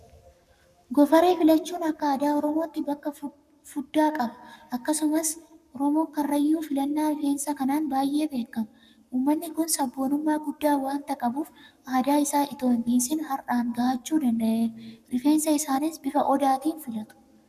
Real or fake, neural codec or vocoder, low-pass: fake; codec, 44.1 kHz, 7.8 kbps, Pupu-Codec; 14.4 kHz